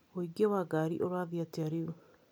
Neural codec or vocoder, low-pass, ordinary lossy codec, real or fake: none; none; none; real